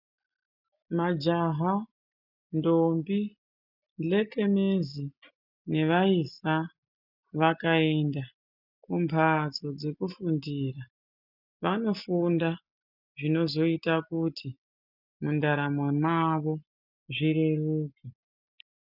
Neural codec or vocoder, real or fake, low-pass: none; real; 5.4 kHz